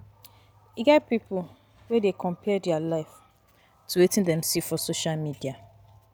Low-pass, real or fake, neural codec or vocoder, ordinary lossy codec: none; real; none; none